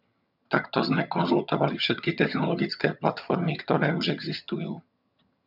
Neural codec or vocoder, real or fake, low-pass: vocoder, 22.05 kHz, 80 mel bands, HiFi-GAN; fake; 5.4 kHz